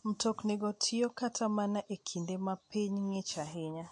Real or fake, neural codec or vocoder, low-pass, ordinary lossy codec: real; none; 10.8 kHz; MP3, 48 kbps